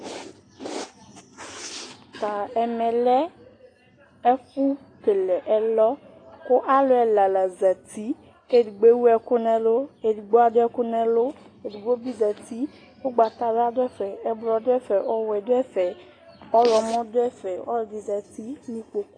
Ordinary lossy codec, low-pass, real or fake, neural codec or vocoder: AAC, 32 kbps; 9.9 kHz; real; none